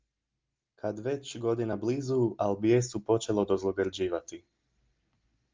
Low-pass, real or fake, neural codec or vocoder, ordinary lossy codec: 7.2 kHz; real; none; Opus, 24 kbps